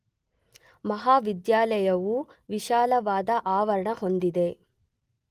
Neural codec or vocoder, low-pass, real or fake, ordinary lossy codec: none; 14.4 kHz; real; Opus, 24 kbps